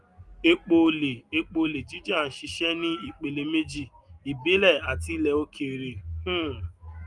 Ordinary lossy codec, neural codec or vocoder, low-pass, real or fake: Opus, 32 kbps; none; 10.8 kHz; real